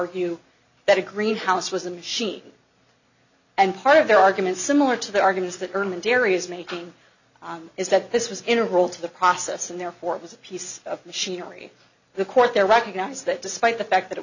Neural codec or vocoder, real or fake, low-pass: none; real; 7.2 kHz